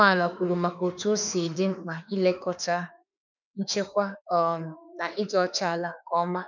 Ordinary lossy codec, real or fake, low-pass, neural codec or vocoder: none; fake; 7.2 kHz; autoencoder, 48 kHz, 32 numbers a frame, DAC-VAE, trained on Japanese speech